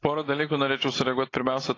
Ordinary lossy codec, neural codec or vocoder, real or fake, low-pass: AAC, 32 kbps; none; real; 7.2 kHz